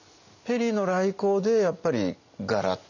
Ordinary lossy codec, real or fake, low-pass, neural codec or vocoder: none; real; 7.2 kHz; none